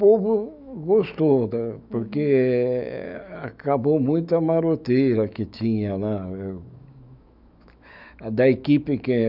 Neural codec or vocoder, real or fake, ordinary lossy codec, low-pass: autoencoder, 48 kHz, 128 numbers a frame, DAC-VAE, trained on Japanese speech; fake; none; 5.4 kHz